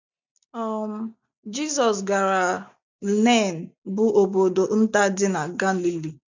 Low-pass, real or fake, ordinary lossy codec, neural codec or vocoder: 7.2 kHz; real; none; none